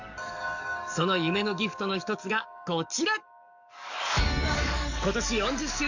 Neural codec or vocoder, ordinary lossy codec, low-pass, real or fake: codec, 44.1 kHz, 7.8 kbps, DAC; none; 7.2 kHz; fake